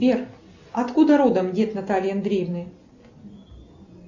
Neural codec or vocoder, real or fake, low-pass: none; real; 7.2 kHz